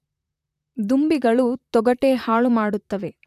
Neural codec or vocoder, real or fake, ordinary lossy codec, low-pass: vocoder, 44.1 kHz, 128 mel bands, Pupu-Vocoder; fake; none; 14.4 kHz